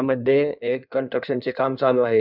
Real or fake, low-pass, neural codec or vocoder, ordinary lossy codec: fake; 5.4 kHz; codec, 16 kHz in and 24 kHz out, 1.1 kbps, FireRedTTS-2 codec; none